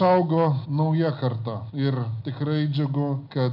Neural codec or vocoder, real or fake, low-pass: none; real; 5.4 kHz